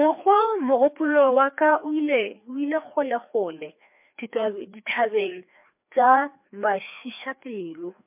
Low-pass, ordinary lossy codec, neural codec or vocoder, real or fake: 3.6 kHz; MP3, 32 kbps; codec, 16 kHz, 2 kbps, FreqCodec, larger model; fake